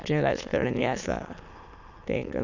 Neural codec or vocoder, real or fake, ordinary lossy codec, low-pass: autoencoder, 22.05 kHz, a latent of 192 numbers a frame, VITS, trained on many speakers; fake; none; 7.2 kHz